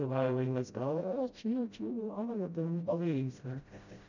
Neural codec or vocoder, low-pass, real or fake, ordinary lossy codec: codec, 16 kHz, 0.5 kbps, FreqCodec, smaller model; 7.2 kHz; fake; none